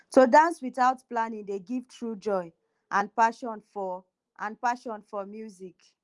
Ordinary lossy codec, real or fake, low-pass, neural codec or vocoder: Opus, 24 kbps; real; 10.8 kHz; none